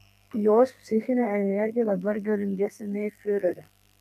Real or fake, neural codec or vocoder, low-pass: fake; codec, 32 kHz, 1.9 kbps, SNAC; 14.4 kHz